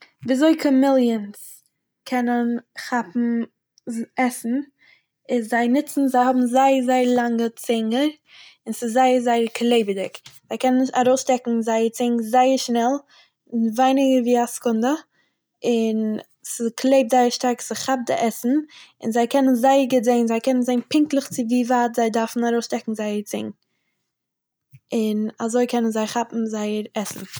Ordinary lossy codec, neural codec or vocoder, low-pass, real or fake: none; none; none; real